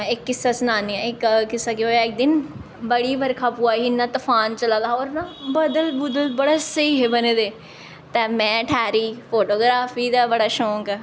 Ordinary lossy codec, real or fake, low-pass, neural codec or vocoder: none; real; none; none